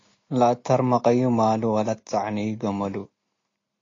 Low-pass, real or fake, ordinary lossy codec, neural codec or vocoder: 7.2 kHz; real; AAC, 32 kbps; none